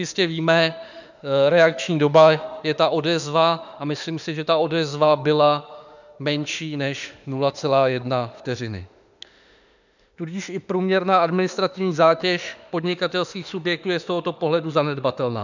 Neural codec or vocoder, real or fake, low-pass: autoencoder, 48 kHz, 32 numbers a frame, DAC-VAE, trained on Japanese speech; fake; 7.2 kHz